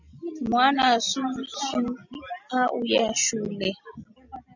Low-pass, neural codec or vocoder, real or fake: 7.2 kHz; none; real